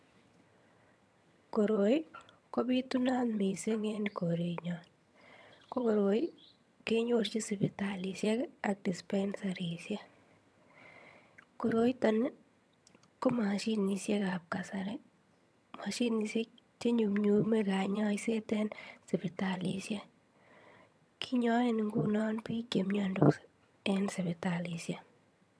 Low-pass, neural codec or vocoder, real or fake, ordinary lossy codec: none; vocoder, 22.05 kHz, 80 mel bands, HiFi-GAN; fake; none